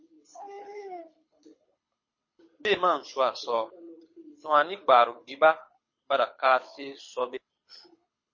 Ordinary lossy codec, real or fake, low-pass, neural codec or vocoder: MP3, 32 kbps; fake; 7.2 kHz; codec, 24 kHz, 6 kbps, HILCodec